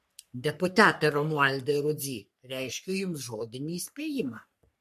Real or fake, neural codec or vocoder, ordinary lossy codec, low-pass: fake; codec, 44.1 kHz, 3.4 kbps, Pupu-Codec; MP3, 64 kbps; 14.4 kHz